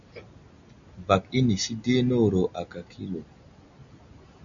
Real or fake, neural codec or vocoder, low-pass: real; none; 7.2 kHz